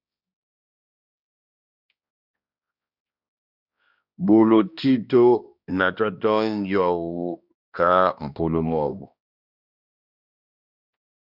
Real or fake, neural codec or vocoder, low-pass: fake; codec, 16 kHz, 1 kbps, X-Codec, HuBERT features, trained on balanced general audio; 5.4 kHz